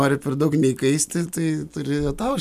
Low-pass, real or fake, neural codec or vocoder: 14.4 kHz; real; none